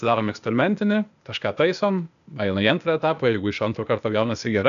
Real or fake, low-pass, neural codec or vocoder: fake; 7.2 kHz; codec, 16 kHz, 0.8 kbps, ZipCodec